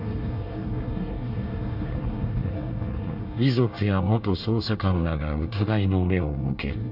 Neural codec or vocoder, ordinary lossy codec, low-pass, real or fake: codec, 24 kHz, 1 kbps, SNAC; none; 5.4 kHz; fake